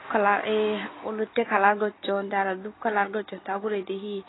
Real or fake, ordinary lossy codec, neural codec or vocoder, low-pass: real; AAC, 16 kbps; none; 7.2 kHz